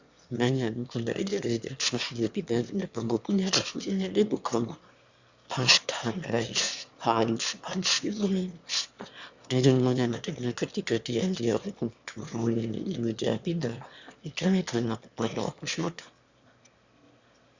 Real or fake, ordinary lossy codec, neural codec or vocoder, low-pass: fake; Opus, 64 kbps; autoencoder, 22.05 kHz, a latent of 192 numbers a frame, VITS, trained on one speaker; 7.2 kHz